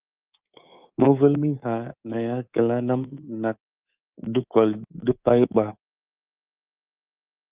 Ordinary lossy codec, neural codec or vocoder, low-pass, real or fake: Opus, 32 kbps; codec, 24 kHz, 3.1 kbps, DualCodec; 3.6 kHz; fake